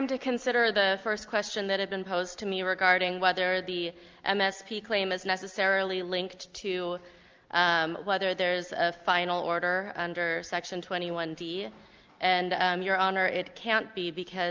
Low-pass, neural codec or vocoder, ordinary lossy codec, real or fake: 7.2 kHz; none; Opus, 32 kbps; real